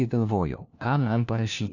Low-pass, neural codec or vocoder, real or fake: 7.2 kHz; codec, 16 kHz, 1 kbps, FunCodec, trained on LibriTTS, 50 frames a second; fake